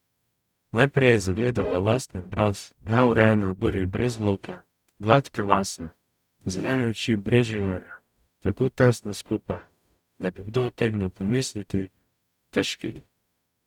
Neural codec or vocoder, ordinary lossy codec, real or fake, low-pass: codec, 44.1 kHz, 0.9 kbps, DAC; none; fake; 19.8 kHz